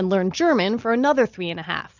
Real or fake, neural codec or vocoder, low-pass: real; none; 7.2 kHz